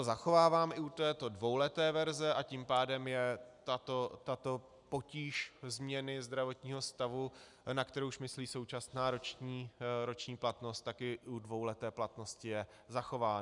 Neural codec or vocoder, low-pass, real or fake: none; 10.8 kHz; real